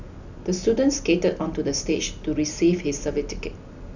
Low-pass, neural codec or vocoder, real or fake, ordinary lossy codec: 7.2 kHz; none; real; none